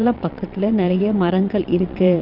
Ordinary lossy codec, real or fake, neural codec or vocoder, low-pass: none; fake; vocoder, 22.05 kHz, 80 mel bands, WaveNeXt; 5.4 kHz